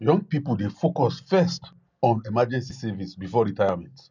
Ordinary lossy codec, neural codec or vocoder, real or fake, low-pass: none; none; real; 7.2 kHz